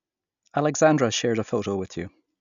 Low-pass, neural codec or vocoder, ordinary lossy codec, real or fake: 7.2 kHz; none; none; real